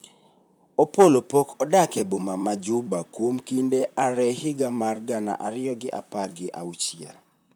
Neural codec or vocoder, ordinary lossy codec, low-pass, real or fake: vocoder, 44.1 kHz, 128 mel bands, Pupu-Vocoder; none; none; fake